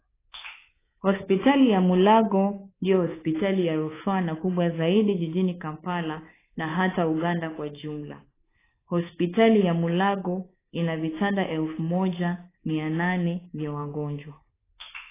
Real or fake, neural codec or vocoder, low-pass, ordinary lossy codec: fake; codec, 24 kHz, 3.1 kbps, DualCodec; 3.6 kHz; AAC, 16 kbps